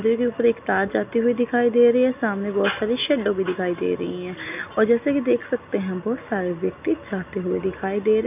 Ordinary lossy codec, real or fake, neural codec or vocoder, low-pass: none; real; none; 3.6 kHz